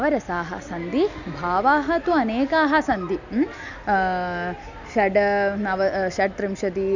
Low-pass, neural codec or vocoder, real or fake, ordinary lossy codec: 7.2 kHz; none; real; none